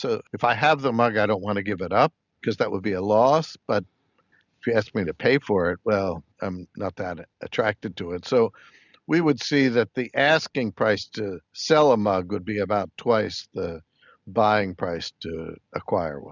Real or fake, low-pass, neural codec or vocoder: real; 7.2 kHz; none